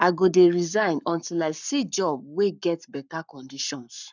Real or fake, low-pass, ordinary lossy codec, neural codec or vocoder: fake; 7.2 kHz; none; codec, 44.1 kHz, 7.8 kbps, Pupu-Codec